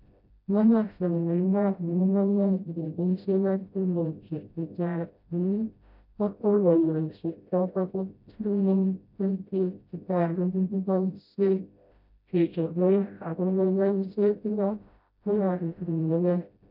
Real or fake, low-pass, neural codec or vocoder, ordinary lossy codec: fake; 5.4 kHz; codec, 16 kHz, 0.5 kbps, FreqCodec, smaller model; none